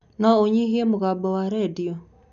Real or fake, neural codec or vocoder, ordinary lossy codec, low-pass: real; none; none; 7.2 kHz